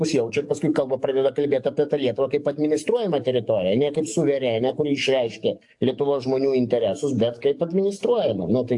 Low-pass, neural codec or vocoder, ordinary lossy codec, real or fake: 10.8 kHz; codec, 44.1 kHz, 7.8 kbps, DAC; AAC, 64 kbps; fake